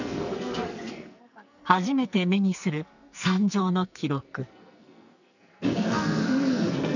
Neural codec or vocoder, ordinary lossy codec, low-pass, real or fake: codec, 44.1 kHz, 2.6 kbps, SNAC; none; 7.2 kHz; fake